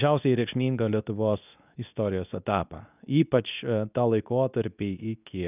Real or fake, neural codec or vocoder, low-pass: fake; codec, 24 kHz, 0.9 kbps, WavTokenizer, medium speech release version 2; 3.6 kHz